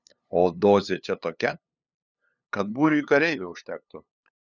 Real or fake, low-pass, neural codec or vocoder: fake; 7.2 kHz; codec, 16 kHz, 2 kbps, FunCodec, trained on LibriTTS, 25 frames a second